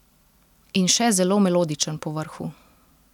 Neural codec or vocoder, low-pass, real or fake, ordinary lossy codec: none; 19.8 kHz; real; none